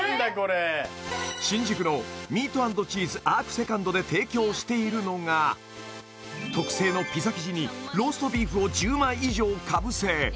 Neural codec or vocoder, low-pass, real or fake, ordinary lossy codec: none; none; real; none